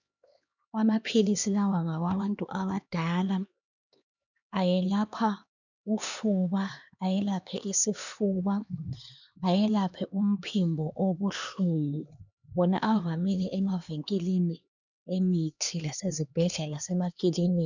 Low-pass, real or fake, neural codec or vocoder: 7.2 kHz; fake; codec, 16 kHz, 2 kbps, X-Codec, HuBERT features, trained on LibriSpeech